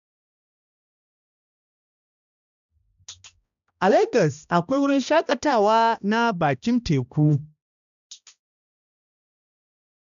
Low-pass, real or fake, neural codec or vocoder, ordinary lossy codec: 7.2 kHz; fake; codec, 16 kHz, 1 kbps, X-Codec, HuBERT features, trained on balanced general audio; none